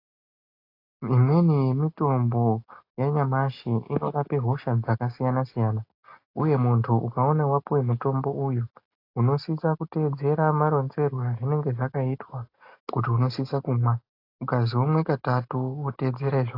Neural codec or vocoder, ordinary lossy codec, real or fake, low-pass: none; AAC, 32 kbps; real; 5.4 kHz